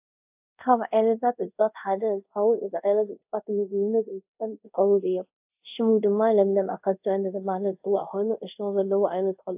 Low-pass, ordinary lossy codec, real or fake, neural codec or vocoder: 3.6 kHz; AAC, 32 kbps; fake; codec, 24 kHz, 0.5 kbps, DualCodec